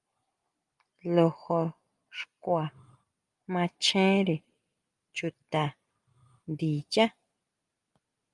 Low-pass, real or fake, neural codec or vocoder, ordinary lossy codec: 10.8 kHz; real; none; Opus, 32 kbps